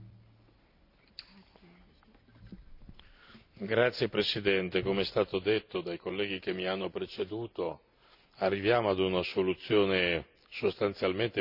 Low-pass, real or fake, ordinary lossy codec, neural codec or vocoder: 5.4 kHz; real; none; none